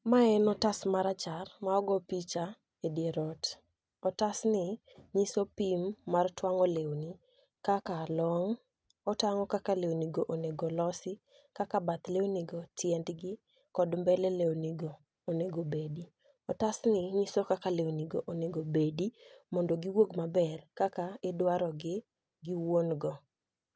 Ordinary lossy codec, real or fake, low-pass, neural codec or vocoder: none; real; none; none